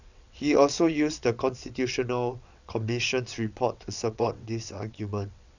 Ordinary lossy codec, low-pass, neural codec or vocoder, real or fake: none; 7.2 kHz; vocoder, 22.05 kHz, 80 mel bands, WaveNeXt; fake